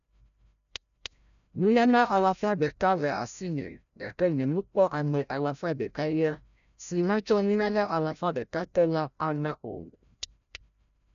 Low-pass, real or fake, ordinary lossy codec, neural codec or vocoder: 7.2 kHz; fake; none; codec, 16 kHz, 0.5 kbps, FreqCodec, larger model